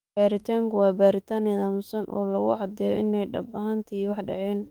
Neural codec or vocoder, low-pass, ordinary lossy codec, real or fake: autoencoder, 48 kHz, 32 numbers a frame, DAC-VAE, trained on Japanese speech; 19.8 kHz; Opus, 32 kbps; fake